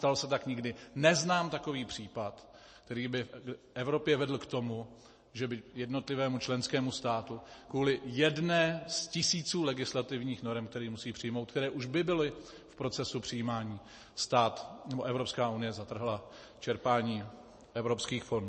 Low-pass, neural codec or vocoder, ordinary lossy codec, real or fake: 10.8 kHz; none; MP3, 32 kbps; real